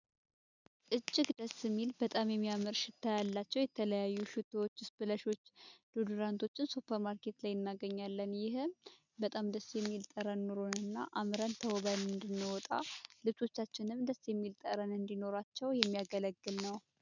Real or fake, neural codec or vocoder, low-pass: real; none; 7.2 kHz